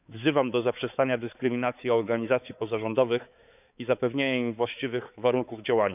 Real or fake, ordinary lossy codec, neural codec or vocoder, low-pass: fake; none; codec, 16 kHz, 4 kbps, X-Codec, HuBERT features, trained on balanced general audio; 3.6 kHz